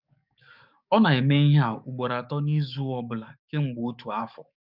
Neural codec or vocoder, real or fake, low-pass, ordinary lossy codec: codec, 44.1 kHz, 7.8 kbps, DAC; fake; 5.4 kHz; none